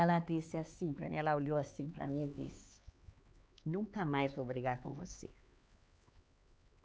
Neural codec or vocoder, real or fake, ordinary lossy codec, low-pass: codec, 16 kHz, 4 kbps, X-Codec, HuBERT features, trained on LibriSpeech; fake; none; none